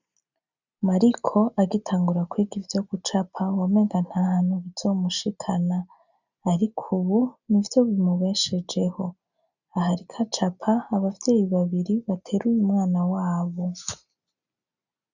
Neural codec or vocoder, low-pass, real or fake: none; 7.2 kHz; real